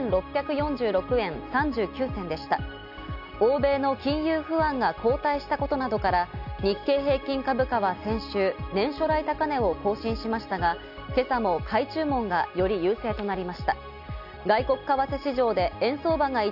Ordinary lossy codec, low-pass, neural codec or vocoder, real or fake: none; 5.4 kHz; none; real